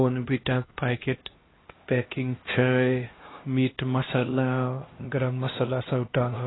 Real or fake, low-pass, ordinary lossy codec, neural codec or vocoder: fake; 7.2 kHz; AAC, 16 kbps; codec, 16 kHz, 1 kbps, X-Codec, HuBERT features, trained on LibriSpeech